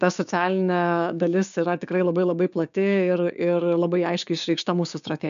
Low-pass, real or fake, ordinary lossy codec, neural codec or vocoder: 7.2 kHz; fake; MP3, 96 kbps; codec, 16 kHz, 6 kbps, DAC